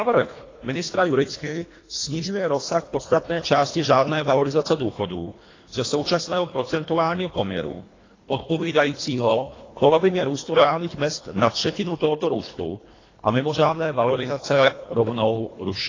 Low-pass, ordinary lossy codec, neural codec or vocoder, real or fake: 7.2 kHz; AAC, 32 kbps; codec, 24 kHz, 1.5 kbps, HILCodec; fake